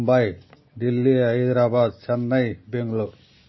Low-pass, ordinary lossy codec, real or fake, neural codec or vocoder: 7.2 kHz; MP3, 24 kbps; real; none